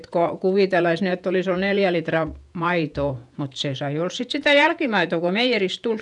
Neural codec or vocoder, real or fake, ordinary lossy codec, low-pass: vocoder, 48 kHz, 128 mel bands, Vocos; fake; none; 10.8 kHz